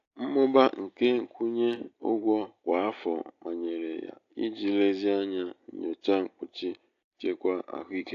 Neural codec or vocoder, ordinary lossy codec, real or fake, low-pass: none; AAC, 48 kbps; real; 7.2 kHz